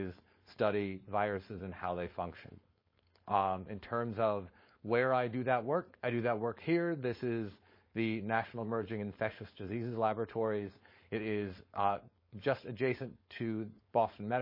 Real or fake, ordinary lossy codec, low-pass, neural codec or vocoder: fake; MP3, 24 kbps; 5.4 kHz; codec, 16 kHz, 4.8 kbps, FACodec